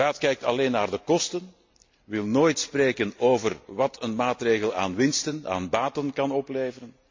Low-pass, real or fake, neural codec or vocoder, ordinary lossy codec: 7.2 kHz; real; none; MP3, 48 kbps